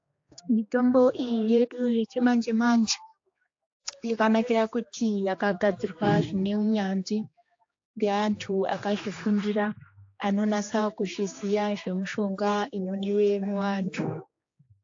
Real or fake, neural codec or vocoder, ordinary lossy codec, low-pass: fake; codec, 16 kHz, 2 kbps, X-Codec, HuBERT features, trained on general audio; AAC, 48 kbps; 7.2 kHz